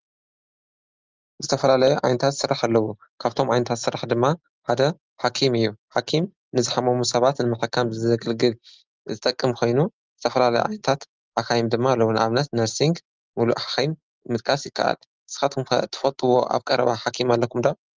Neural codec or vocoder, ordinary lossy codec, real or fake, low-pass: none; Opus, 24 kbps; real; 7.2 kHz